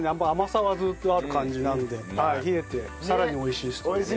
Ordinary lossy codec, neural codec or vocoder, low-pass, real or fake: none; none; none; real